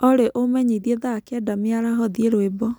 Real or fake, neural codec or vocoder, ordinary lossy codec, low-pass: real; none; none; none